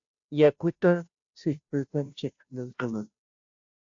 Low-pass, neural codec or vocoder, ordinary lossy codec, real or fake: 7.2 kHz; codec, 16 kHz, 0.5 kbps, FunCodec, trained on Chinese and English, 25 frames a second; AAC, 64 kbps; fake